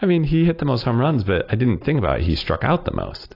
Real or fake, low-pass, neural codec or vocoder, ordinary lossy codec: real; 5.4 kHz; none; AAC, 32 kbps